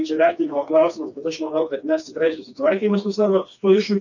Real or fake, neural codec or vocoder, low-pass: fake; codec, 16 kHz, 2 kbps, FreqCodec, smaller model; 7.2 kHz